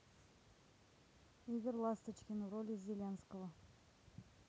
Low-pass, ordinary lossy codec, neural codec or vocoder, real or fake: none; none; none; real